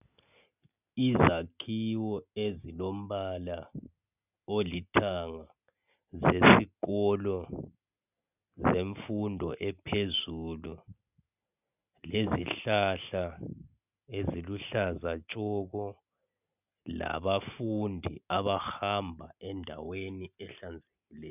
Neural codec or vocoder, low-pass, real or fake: none; 3.6 kHz; real